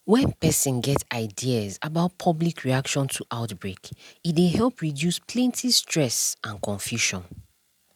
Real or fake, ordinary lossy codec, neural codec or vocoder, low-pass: real; none; none; 19.8 kHz